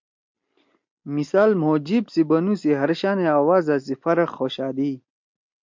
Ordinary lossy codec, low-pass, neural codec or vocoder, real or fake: MP3, 48 kbps; 7.2 kHz; none; real